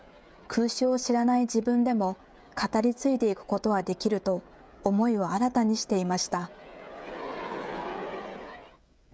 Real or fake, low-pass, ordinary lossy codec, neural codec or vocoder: fake; none; none; codec, 16 kHz, 16 kbps, FreqCodec, smaller model